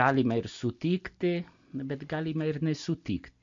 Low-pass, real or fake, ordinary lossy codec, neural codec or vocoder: 7.2 kHz; real; MP3, 64 kbps; none